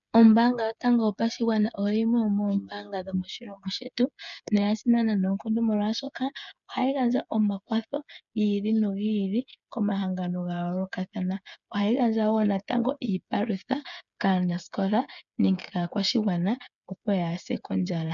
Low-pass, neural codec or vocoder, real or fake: 7.2 kHz; codec, 16 kHz, 8 kbps, FreqCodec, smaller model; fake